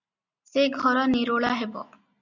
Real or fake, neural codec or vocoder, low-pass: real; none; 7.2 kHz